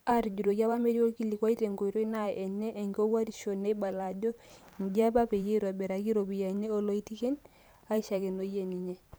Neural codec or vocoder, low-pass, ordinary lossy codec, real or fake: vocoder, 44.1 kHz, 128 mel bands every 512 samples, BigVGAN v2; none; none; fake